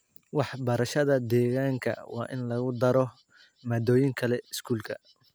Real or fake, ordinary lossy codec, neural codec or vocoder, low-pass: real; none; none; none